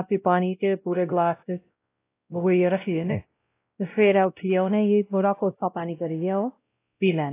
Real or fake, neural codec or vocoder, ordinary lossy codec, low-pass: fake; codec, 16 kHz, 0.5 kbps, X-Codec, WavLM features, trained on Multilingual LibriSpeech; AAC, 24 kbps; 3.6 kHz